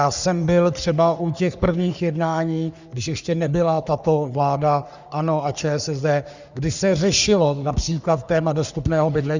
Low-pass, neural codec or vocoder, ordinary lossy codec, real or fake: 7.2 kHz; codec, 44.1 kHz, 3.4 kbps, Pupu-Codec; Opus, 64 kbps; fake